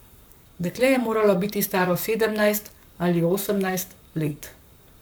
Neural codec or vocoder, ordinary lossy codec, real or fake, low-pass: codec, 44.1 kHz, 7.8 kbps, Pupu-Codec; none; fake; none